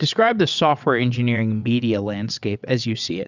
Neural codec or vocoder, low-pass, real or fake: vocoder, 22.05 kHz, 80 mel bands, WaveNeXt; 7.2 kHz; fake